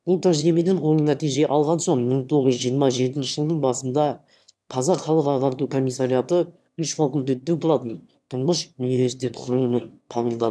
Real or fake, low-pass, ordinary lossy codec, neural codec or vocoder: fake; none; none; autoencoder, 22.05 kHz, a latent of 192 numbers a frame, VITS, trained on one speaker